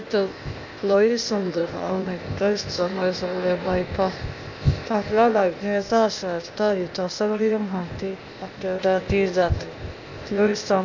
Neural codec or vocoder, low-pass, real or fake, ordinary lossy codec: codec, 16 kHz, 0.8 kbps, ZipCodec; 7.2 kHz; fake; none